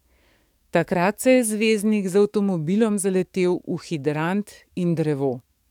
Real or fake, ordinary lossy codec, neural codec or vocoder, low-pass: fake; none; codec, 44.1 kHz, 7.8 kbps, DAC; 19.8 kHz